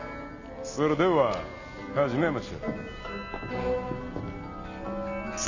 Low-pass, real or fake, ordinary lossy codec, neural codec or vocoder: 7.2 kHz; real; none; none